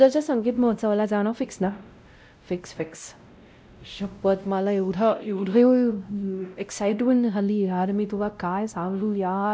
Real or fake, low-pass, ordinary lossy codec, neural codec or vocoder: fake; none; none; codec, 16 kHz, 0.5 kbps, X-Codec, WavLM features, trained on Multilingual LibriSpeech